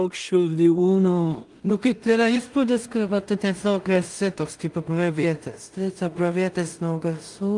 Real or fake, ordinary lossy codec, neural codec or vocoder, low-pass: fake; Opus, 32 kbps; codec, 16 kHz in and 24 kHz out, 0.4 kbps, LongCat-Audio-Codec, two codebook decoder; 10.8 kHz